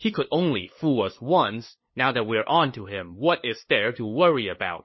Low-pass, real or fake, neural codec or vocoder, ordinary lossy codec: 7.2 kHz; fake; codec, 16 kHz, 2 kbps, FunCodec, trained on LibriTTS, 25 frames a second; MP3, 24 kbps